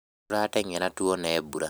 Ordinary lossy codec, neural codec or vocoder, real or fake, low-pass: none; none; real; none